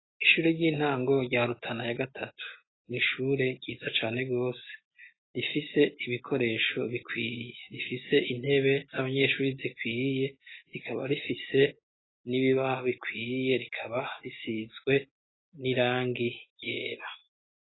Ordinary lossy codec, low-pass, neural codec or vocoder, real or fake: AAC, 16 kbps; 7.2 kHz; none; real